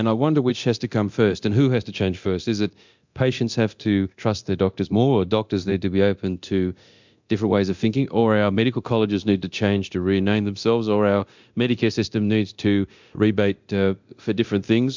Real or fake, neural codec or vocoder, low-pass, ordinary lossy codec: fake; codec, 24 kHz, 0.9 kbps, DualCodec; 7.2 kHz; MP3, 64 kbps